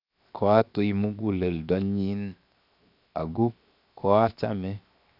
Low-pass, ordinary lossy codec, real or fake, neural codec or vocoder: 5.4 kHz; none; fake; codec, 16 kHz, 0.7 kbps, FocalCodec